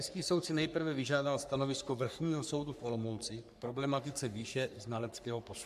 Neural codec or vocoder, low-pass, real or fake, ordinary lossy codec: codec, 44.1 kHz, 3.4 kbps, Pupu-Codec; 14.4 kHz; fake; AAC, 96 kbps